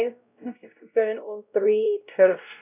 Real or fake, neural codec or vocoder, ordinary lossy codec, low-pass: fake; codec, 16 kHz, 0.5 kbps, X-Codec, WavLM features, trained on Multilingual LibriSpeech; none; 3.6 kHz